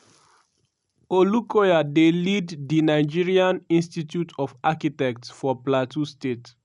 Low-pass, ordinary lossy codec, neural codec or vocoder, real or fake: 10.8 kHz; none; none; real